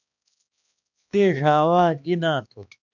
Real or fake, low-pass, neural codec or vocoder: fake; 7.2 kHz; codec, 16 kHz, 2 kbps, X-Codec, HuBERT features, trained on balanced general audio